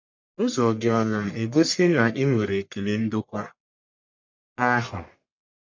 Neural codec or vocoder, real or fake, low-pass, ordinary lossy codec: codec, 44.1 kHz, 1.7 kbps, Pupu-Codec; fake; 7.2 kHz; MP3, 48 kbps